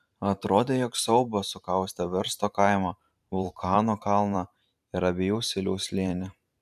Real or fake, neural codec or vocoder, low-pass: fake; vocoder, 44.1 kHz, 128 mel bands every 512 samples, BigVGAN v2; 14.4 kHz